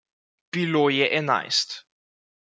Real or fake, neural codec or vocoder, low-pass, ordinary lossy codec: real; none; none; none